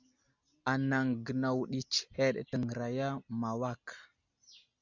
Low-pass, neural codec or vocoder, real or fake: 7.2 kHz; none; real